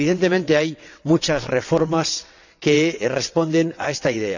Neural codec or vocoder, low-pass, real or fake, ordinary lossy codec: vocoder, 22.05 kHz, 80 mel bands, WaveNeXt; 7.2 kHz; fake; none